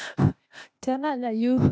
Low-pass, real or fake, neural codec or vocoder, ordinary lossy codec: none; fake; codec, 16 kHz, 0.8 kbps, ZipCodec; none